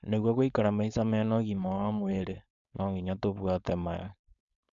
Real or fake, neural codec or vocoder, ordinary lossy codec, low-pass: fake; codec, 16 kHz, 4.8 kbps, FACodec; none; 7.2 kHz